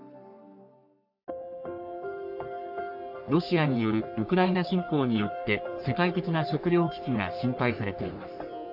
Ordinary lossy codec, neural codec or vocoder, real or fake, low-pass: AAC, 48 kbps; codec, 44.1 kHz, 3.4 kbps, Pupu-Codec; fake; 5.4 kHz